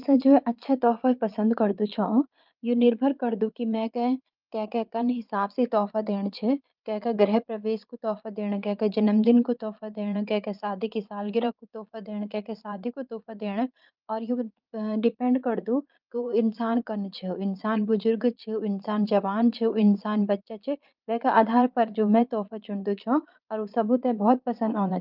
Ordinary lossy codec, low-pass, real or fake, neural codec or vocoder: Opus, 24 kbps; 5.4 kHz; fake; vocoder, 22.05 kHz, 80 mel bands, Vocos